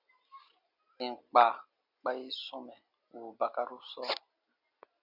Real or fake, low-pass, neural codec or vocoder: real; 5.4 kHz; none